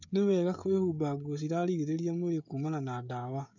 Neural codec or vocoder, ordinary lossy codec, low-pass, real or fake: codec, 16 kHz, 16 kbps, FreqCodec, smaller model; none; 7.2 kHz; fake